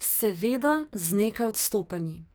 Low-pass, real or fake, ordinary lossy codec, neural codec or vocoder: none; fake; none; codec, 44.1 kHz, 2.6 kbps, SNAC